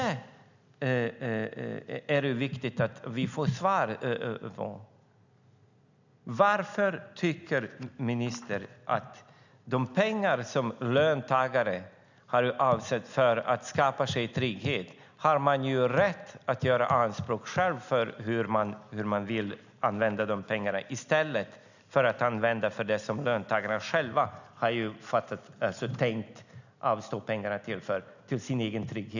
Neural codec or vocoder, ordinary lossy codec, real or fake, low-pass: none; none; real; 7.2 kHz